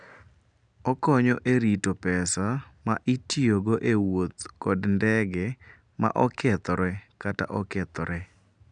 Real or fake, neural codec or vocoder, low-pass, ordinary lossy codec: real; none; 9.9 kHz; none